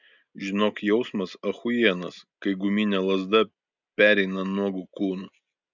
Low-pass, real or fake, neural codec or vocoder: 7.2 kHz; real; none